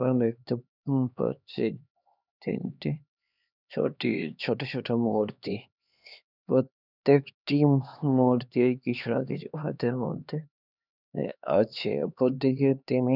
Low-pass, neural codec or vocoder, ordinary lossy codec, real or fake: 5.4 kHz; codec, 16 kHz, 2 kbps, X-Codec, HuBERT features, trained on LibriSpeech; none; fake